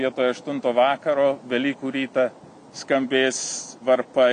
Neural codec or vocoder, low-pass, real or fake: none; 9.9 kHz; real